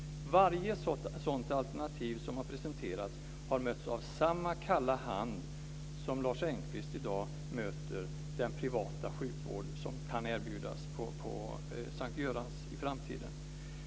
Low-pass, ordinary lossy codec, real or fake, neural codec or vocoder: none; none; real; none